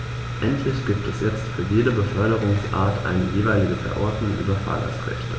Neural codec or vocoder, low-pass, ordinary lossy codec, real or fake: none; none; none; real